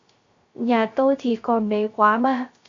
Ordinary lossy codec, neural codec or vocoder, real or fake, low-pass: MP3, 48 kbps; codec, 16 kHz, 0.3 kbps, FocalCodec; fake; 7.2 kHz